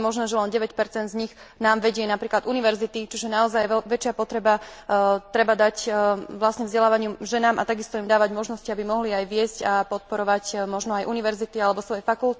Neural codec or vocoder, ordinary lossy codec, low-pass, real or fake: none; none; none; real